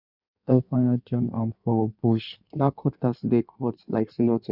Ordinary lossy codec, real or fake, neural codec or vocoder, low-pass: none; fake; codec, 16 kHz in and 24 kHz out, 1.1 kbps, FireRedTTS-2 codec; 5.4 kHz